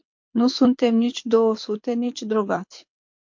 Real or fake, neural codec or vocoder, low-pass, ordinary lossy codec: fake; codec, 24 kHz, 6 kbps, HILCodec; 7.2 kHz; MP3, 48 kbps